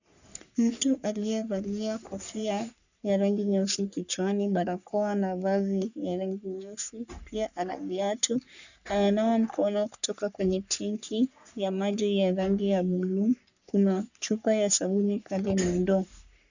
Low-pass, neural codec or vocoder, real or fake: 7.2 kHz; codec, 44.1 kHz, 3.4 kbps, Pupu-Codec; fake